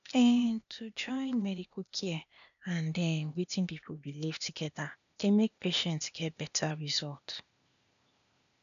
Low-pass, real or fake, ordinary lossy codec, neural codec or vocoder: 7.2 kHz; fake; none; codec, 16 kHz, 0.8 kbps, ZipCodec